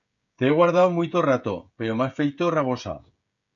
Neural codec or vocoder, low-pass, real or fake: codec, 16 kHz, 16 kbps, FreqCodec, smaller model; 7.2 kHz; fake